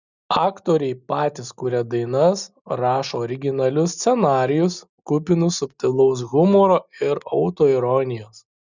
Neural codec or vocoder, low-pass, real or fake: none; 7.2 kHz; real